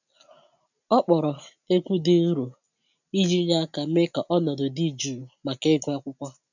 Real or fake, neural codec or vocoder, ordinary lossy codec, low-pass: real; none; none; 7.2 kHz